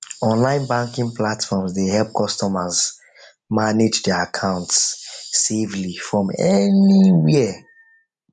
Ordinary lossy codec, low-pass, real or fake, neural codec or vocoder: none; 10.8 kHz; real; none